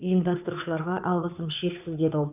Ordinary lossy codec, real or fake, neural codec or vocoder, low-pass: none; fake; codec, 16 kHz, 2 kbps, FunCodec, trained on Chinese and English, 25 frames a second; 3.6 kHz